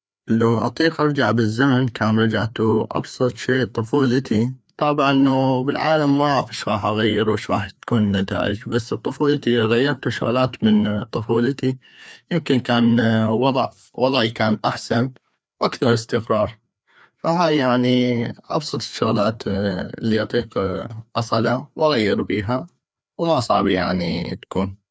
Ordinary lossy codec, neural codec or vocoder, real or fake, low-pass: none; codec, 16 kHz, 2 kbps, FreqCodec, larger model; fake; none